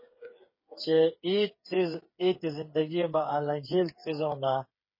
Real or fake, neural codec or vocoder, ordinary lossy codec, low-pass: fake; codec, 16 kHz, 8 kbps, FreqCodec, smaller model; MP3, 24 kbps; 5.4 kHz